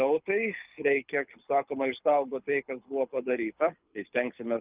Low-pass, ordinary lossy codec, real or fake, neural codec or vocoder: 3.6 kHz; Opus, 24 kbps; fake; codec, 24 kHz, 6 kbps, HILCodec